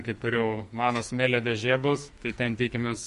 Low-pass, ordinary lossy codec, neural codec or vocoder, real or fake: 14.4 kHz; MP3, 48 kbps; codec, 44.1 kHz, 2.6 kbps, SNAC; fake